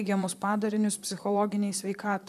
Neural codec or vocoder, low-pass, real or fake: vocoder, 44.1 kHz, 128 mel bands, Pupu-Vocoder; 14.4 kHz; fake